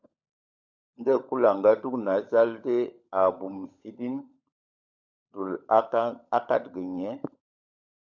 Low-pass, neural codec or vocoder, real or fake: 7.2 kHz; codec, 16 kHz, 16 kbps, FunCodec, trained on LibriTTS, 50 frames a second; fake